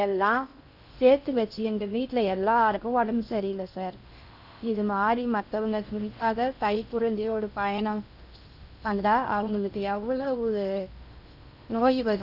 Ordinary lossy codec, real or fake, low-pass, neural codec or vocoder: none; fake; 5.4 kHz; codec, 16 kHz in and 24 kHz out, 0.6 kbps, FocalCodec, streaming, 4096 codes